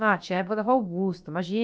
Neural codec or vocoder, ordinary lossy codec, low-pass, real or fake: codec, 16 kHz, about 1 kbps, DyCAST, with the encoder's durations; none; none; fake